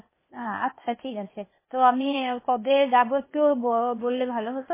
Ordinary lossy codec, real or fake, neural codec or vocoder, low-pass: MP3, 16 kbps; fake; codec, 16 kHz, 0.8 kbps, ZipCodec; 3.6 kHz